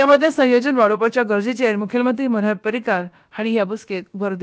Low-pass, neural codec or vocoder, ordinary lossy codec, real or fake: none; codec, 16 kHz, about 1 kbps, DyCAST, with the encoder's durations; none; fake